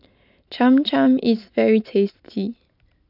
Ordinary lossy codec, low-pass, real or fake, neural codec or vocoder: none; 5.4 kHz; real; none